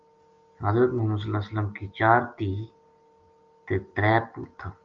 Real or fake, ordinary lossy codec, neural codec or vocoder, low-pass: real; Opus, 32 kbps; none; 7.2 kHz